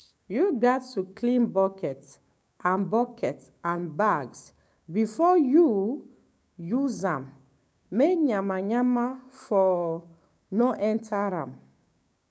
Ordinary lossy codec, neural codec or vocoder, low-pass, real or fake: none; codec, 16 kHz, 6 kbps, DAC; none; fake